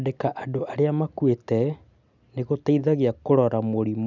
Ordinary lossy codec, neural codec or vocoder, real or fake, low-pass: none; none; real; 7.2 kHz